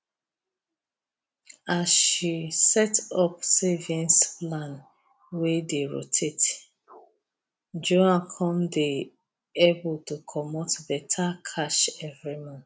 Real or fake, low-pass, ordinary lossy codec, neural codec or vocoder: real; none; none; none